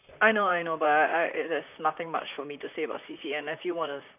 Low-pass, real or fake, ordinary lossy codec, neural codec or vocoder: 3.6 kHz; fake; none; vocoder, 44.1 kHz, 128 mel bands, Pupu-Vocoder